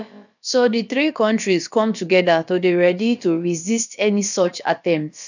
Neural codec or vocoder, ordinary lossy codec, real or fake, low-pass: codec, 16 kHz, about 1 kbps, DyCAST, with the encoder's durations; none; fake; 7.2 kHz